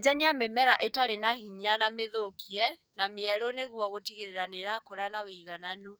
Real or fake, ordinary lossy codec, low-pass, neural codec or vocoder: fake; none; none; codec, 44.1 kHz, 2.6 kbps, SNAC